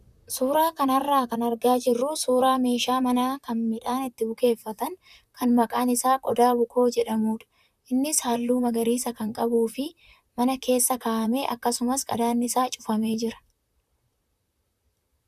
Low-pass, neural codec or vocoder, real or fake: 14.4 kHz; vocoder, 44.1 kHz, 128 mel bands, Pupu-Vocoder; fake